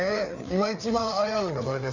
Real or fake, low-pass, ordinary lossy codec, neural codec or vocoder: fake; 7.2 kHz; none; codec, 16 kHz, 4 kbps, FreqCodec, larger model